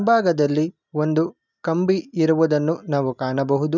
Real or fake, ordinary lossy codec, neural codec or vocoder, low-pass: real; none; none; 7.2 kHz